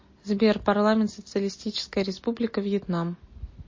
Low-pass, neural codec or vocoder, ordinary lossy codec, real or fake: 7.2 kHz; none; MP3, 32 kbps; real